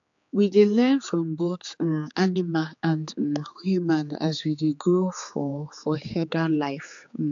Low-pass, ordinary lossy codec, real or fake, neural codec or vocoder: 7.2 kHz; AAC, 64 kbps; fake; codec, 16 kHz, 4 kbps, X-Codec, HuBERT features, trained on general audio